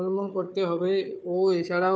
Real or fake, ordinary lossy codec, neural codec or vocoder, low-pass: fake; none; codec, 16 kHz, 4 kbps, FunCodec, trained on Chinese and English, 50 frames a second; none